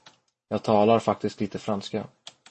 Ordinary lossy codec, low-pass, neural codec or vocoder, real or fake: MP3, 32 kbps; 10.8 kHz; none; real